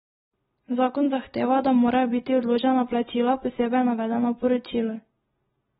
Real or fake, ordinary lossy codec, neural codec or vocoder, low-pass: real; AAC, 16 kbps; none; 9.9 kHz